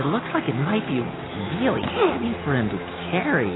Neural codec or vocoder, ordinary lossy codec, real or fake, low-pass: none; AAC, 16 kbps; real; 7.2 kHz